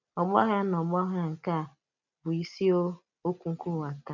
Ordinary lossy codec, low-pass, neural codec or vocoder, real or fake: none; 7.2 kHz; none; real